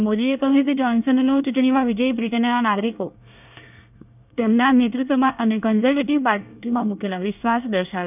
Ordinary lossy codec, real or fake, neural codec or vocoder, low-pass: none; fake; codec, 24 kHz, 1 kbps, SNAC; 3.6 kHz